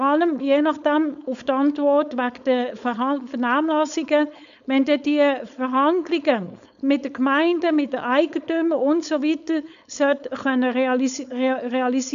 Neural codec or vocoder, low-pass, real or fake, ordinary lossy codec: codec, 16 kHz, 4.8 kbps, FACodec; 7.2 kHz; fake; none